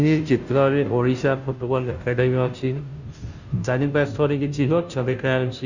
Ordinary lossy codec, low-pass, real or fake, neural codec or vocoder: Opus, 64 kbps; 7.2 kHz; fake; codec, 16 kHz, 0.5 kbps, FunCodec, trained on Chinese and English, 25 frames a second